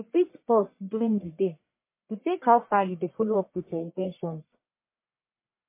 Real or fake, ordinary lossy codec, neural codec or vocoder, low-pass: fake; MP3, 24 kbps; codec, 44.1 kHz, 1.7 kbps, Pupu-Codec; 3.6 kHz